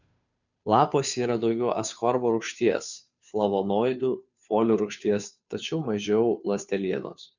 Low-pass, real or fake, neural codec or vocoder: 7.2 kHz; fake; codec, 16 kHz, 2 kbps, FunCodec, trained on Chinese and English, 25 frames a second